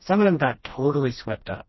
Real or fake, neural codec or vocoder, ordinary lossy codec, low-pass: fake; codec, 16 kHz, 1 kbps, FreqCodec, smaller model; MP3, 24 kbps; 7.2 kHz